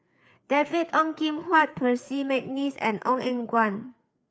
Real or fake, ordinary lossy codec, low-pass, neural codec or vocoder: fake; none; none; codec, 16 kHz, 4 kbps, FreqCodec, larger model